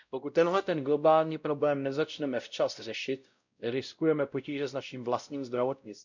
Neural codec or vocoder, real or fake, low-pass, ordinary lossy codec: codec, 16 kHz, 0.5 kbps, X-Codec, WavLM features, trained on Multilingual LibriSpeech; fake; 7.2 kHz; none